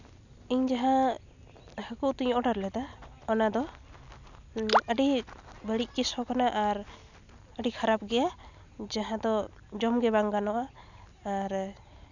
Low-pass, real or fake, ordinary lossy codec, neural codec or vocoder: 7.2 kHz; real; none; none